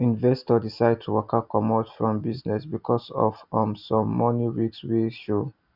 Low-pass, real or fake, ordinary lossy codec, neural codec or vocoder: 5.4 kHz; real; none; none